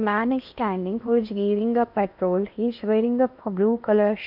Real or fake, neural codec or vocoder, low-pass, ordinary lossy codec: fake; codec, 16 kHz in and 24 kHz out, 0.8 kbps, FocalCodec, streaming, 65536 codes; 5.4 kHz; none